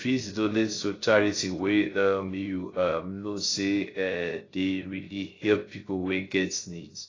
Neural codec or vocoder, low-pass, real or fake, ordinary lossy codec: codec, 16 kHz, 0.3 kbps, FocalCodec; 7.2 kHz; fake; AAC, 32 kbps